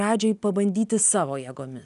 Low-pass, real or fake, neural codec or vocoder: 10.8 kHz; real; none